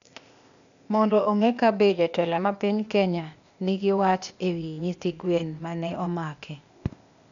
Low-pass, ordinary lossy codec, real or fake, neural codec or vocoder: 7.2 kHz; none; fake; codec, 16 kHz, 0.8 kbps, ZipCodec